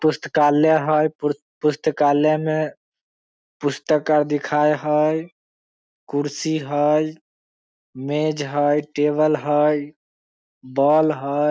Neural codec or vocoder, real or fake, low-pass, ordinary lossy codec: none; real; none; none